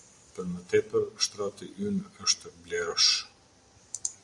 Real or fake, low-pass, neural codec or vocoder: real; 10.8 kHz; none